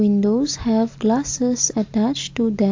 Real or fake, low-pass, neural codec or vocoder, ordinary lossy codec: real; 7.2 kHz; none; AAC, 48 kbps